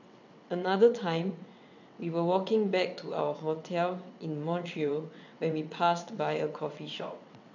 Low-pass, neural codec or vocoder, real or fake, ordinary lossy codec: 7.2 kHz; vocoder, 22.05 kHz, 80 mel bands, WaveNeXt; fake; none